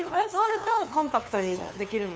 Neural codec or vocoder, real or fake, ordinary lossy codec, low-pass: codec, 16 kHz, 2 kbps, FunCodec, trained on LibriTTS, 25 frames a second; fake; none; none